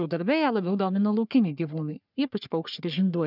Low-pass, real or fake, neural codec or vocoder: 5.4 kHz; fake; codec, 44.1 kHz, 3.4 kbps, Pupu-Codec